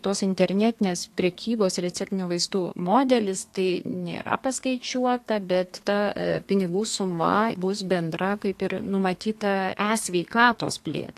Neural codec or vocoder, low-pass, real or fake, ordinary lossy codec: codec, 32 kHz, 1.9 kbps, SNAC; 14.4 kHz; fake; AAC, 64 kbps